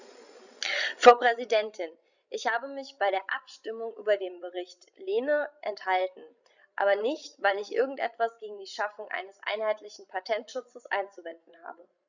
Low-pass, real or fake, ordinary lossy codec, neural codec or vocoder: 7.2 kHz; fake; none; codec, 16 kHz, 16 kbps, FreqCodec, larger model